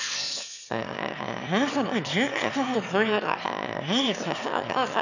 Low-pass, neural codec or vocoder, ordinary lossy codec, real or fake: 7.2 kHz; autoencoder, 22.05 kHz, a latent of 192 numbers a frame, VITS, trained on one speaker; none; fake